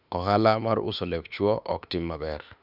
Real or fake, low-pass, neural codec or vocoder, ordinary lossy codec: fake; 5.4 kHz; autoencoder, 48 kHz, 32 numbers a frame, DAC-VAE, trained on Japanese speech; none